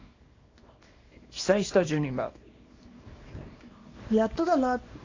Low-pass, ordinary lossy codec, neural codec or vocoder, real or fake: 7.2 kHz; AAC, 32 kbps; codec, 24 kHz, 0.9 kbps, WavTokenizer, small release; fake